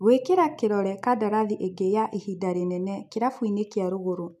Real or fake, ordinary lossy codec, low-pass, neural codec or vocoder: real; none; 14.4 kHz; none